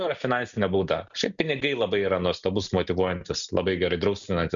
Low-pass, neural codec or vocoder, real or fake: 7.2 kHz; none; real